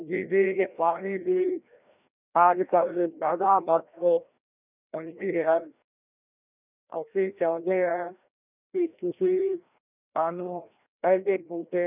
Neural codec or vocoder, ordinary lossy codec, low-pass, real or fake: codec, 16 kHz, 1 kbps, FreqCodec, larger model; none; 3.6 kHz; fake